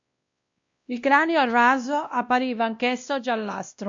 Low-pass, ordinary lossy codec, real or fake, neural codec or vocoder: 7.2 kHz; MP3, 48 kbps; fake; codec, 16 kHz, 1 kbps, X-Codec, WavLM features, trained on Multilingual LibriSpeech